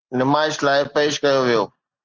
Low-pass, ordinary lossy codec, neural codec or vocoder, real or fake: 7.2 kHz; Opus, 16 kbps; none; real